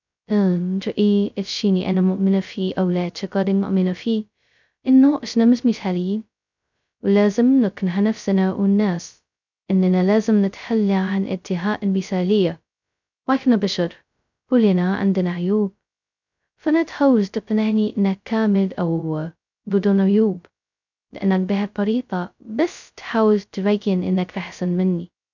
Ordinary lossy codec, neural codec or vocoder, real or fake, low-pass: none; codec, 16 kHz, 0.2 kbps, FocalCodec; fake; 7.2 kHz